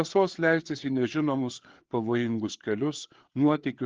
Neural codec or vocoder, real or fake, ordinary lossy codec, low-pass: codec, 16 kHz, 4 kbps, FreqCodec, larger model; fake; Opus, 16 kbps; 7.2 kHz